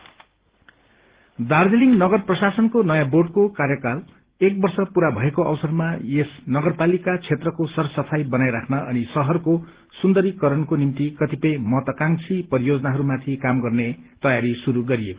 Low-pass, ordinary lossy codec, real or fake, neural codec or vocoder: 3.6 kHz; Opus, 16 kbps; real; none